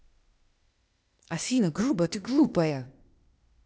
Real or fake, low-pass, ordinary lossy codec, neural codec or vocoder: fake; none; none; codec, 16 kHz, 0.8 kbps, ZipCodec